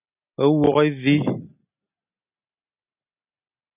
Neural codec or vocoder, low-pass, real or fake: none; 3.6 kHz; real